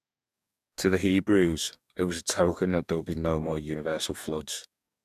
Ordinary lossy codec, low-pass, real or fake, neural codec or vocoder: none; 14.4 kHz; fake; codec, 44.1 kHz, 2.6 kbps, DAC